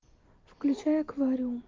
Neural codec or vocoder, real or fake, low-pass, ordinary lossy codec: none; real; 7.2 kHz; Opus, 32 kbps